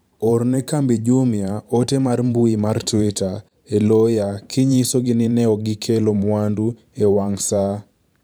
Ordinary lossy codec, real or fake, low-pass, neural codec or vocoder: none; fake; none; vocoder, 44.1 kHz, 128 mel bands every 512 samples, BigVGAN v2